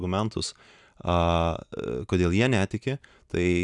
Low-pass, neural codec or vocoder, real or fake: 10.8 kHz; none; real